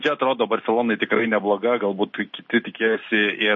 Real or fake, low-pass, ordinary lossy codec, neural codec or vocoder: real; 7.2 kHz; MP3, 32 kbps; none